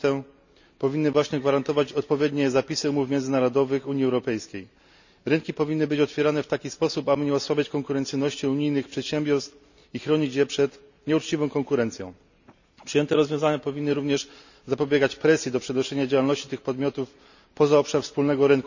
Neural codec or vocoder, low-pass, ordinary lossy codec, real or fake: none; 7.2 kHz; none; real